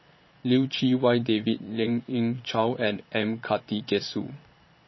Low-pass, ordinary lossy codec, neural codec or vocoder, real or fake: 7.2 kHz; MP3, 24 kbps; vocoder, 22.05 kHz, 80 mel bands, Vocos; fake